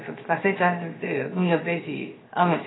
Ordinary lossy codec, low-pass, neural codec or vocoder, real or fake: AAC, 16 kbps; 7.2 kHz; codec, 16 kHz, 0.7 kbps, FocalCodec; fake